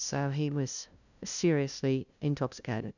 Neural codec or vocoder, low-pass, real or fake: codec, 16 kHz, 0.5 kbps, FunCodec, trained on LibriTTS, 25 frames a second; 7.2 kHz; fake